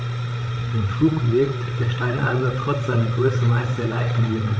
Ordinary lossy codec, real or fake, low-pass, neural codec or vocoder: none; fake; none; codec, 16 kHz, 16 kbps, FreqCodec, larger model